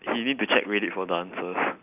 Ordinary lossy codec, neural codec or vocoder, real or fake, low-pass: none; none; real; 3.6 kHz